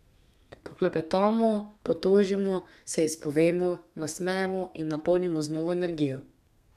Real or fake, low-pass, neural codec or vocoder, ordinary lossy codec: fake; 14.4 kHz; codec, 32 kHz, 1.9 kbps, SNAC; none